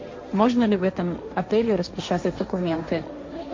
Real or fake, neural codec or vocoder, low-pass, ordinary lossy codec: fake; codec, 16 kHz, 1.1 kbps, Voila-Tokenizer; 7.2 kHz; MP3, 48 kbps